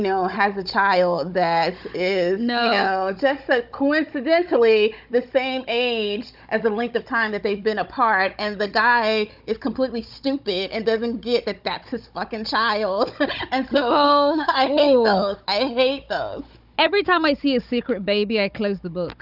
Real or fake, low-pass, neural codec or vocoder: fake; 5.4 kHz; codec, 16 kHz, 16 kbps, FunCodec, trained on Chinese and English, 50 frames a second